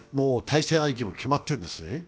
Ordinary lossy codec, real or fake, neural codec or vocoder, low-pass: none; fake; codec, 16 kHz, about 1 kbps, DyCAST, with the encoder's durations; none